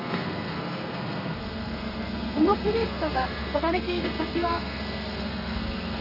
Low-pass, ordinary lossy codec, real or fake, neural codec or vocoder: 5.4 kHz; none; fake; codec, 32 kHz, 1.9 kbps, SNAC